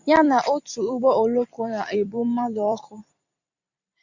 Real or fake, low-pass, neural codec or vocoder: fake; 7.2 kHz; vocoder, 44.1 kHz, 128 mel bands every 256 samples, BigVGAN v2